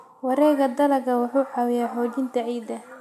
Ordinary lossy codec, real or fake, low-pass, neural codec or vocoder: AAC, 96 kbps; real; 14.4 kHz; none